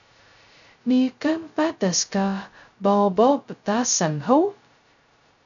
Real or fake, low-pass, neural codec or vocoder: fake; 7.2 kHz; codec, 16 kHz, 0.2 kbps, FocalCodec